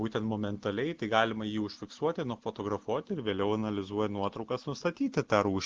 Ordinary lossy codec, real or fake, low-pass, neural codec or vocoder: Opus, 16 kbps; real; 7.2 kHz; none